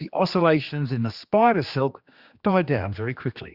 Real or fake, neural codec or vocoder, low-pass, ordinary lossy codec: fake; codec, 16 kHz, 2 kbps, X-Codec, HuBERT features, trained on general audio; 5.4 kHz; Opus, 64 kbps